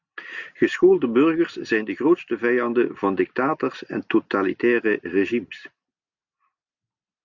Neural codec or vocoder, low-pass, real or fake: none; 7.2 kHz; real